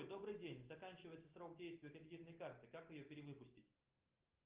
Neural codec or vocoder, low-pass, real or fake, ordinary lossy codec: none; 3.6 kHz; real; Opus, 32 kbps